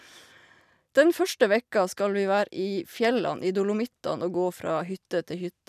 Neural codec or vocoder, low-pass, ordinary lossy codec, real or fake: vocoder, 44.1 kHz, 128 mel bands every 256 samples, BigVGAN v2; 14.4 kHz; none; fake